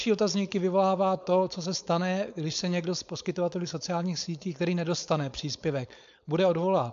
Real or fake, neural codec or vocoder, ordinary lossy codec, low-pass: fake; codec, 16 kHz, 4.8 kbps, FACodec; AAC, 96 kbps; 7.2 kHz